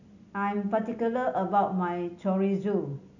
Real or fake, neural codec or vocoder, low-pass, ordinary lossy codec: real; none; 7.2 kHz; none